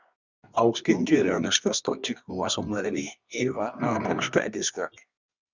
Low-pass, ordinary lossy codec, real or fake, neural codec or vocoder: 7.2 kHz; Opus, 64 kbps; fake; codec, 24 kHz, 0.9 kbps, WavTokenizer, medium music audio release